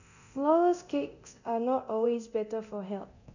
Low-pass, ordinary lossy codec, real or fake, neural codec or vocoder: 7.2 kHz; none; fake; codec, 24 kHz, 0.9 kbps, DualCodec